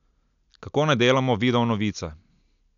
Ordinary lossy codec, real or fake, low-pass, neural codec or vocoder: none; real; 7.2 kHz; none